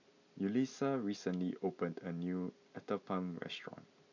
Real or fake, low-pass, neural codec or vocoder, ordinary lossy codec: real; 7.2 kHz; none; none